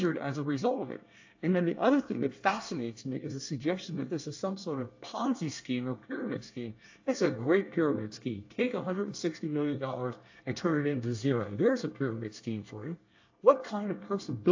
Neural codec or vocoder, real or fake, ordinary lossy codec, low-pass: codec, 24 kHz, 1 kbps, SNAC; fake; AAC, 48 kbps; 7.2 kHz